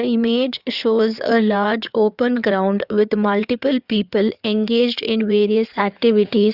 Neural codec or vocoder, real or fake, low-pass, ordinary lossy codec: codec, 16 kHz in and 24 kHz out, 2.2 kbps, FireRedTTS-2 codec; fake; 5.4 kHz; Opus, 64 kbps